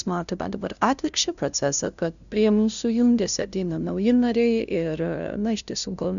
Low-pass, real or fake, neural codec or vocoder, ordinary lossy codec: 7.2 kHz; fake; codec, 16 kHz, 0.5 kbps, FunCodec, trained on LibriTTS, 25 frames a second; MP3, 96 kbps